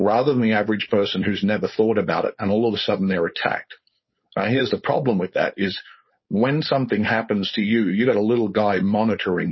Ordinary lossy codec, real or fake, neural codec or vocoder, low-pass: MP3, 24 kbps; fake; codec, 16 kHz, 4.8 kbps, FACodec; 7.2 kHz